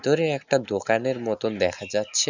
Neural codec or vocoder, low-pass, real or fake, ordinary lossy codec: none; 7.2 kHz; real; none